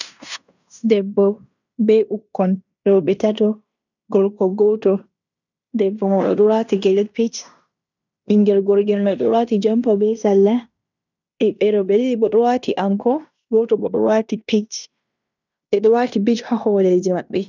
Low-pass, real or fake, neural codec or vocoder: 7.2 kHz; fake; codec, 16 kHz in and 24 kHz out, 0.9 kbps, LongCat-Audio-Codec, fine tuned four codebook decoder